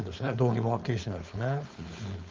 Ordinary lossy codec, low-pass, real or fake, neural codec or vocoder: Opus, 24 kbps; 7.2 kHz; fake; codec, 16 kHz, 4.8 kbps, FACodec